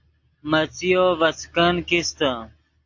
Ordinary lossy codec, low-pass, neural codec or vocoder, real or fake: AAC, 48 kbps; 7.2 kHz; none; real